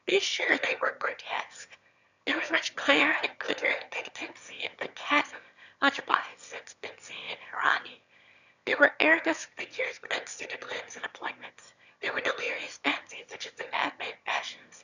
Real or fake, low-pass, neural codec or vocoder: fake; 7.2 kHz; autoencoder, 22.05 kHz, a latent of 192 numbers a frame, VITS, trained on one speaker